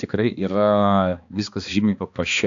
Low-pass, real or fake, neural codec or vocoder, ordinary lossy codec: 7.2 kHz; fake; codec, 16 kHz, 2 kbps, X-Codec, HuBERT features, trained on balanced general audio; AAC, 64 kbps